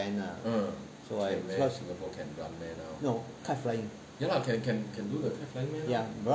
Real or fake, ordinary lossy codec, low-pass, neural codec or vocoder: real; none; none; none